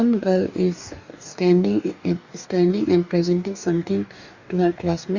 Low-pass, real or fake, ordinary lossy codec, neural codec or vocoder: 7.2 kHz; fake; Opus, 64 kbps; codec, 44.1 kHz, 2.6 kbps, DAC